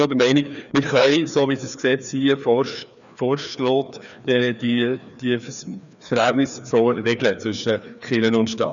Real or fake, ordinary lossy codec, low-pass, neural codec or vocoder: fake; none; 7.2 kHz; codec, 16 kHz, 2 kbps, FreqCodec, larger model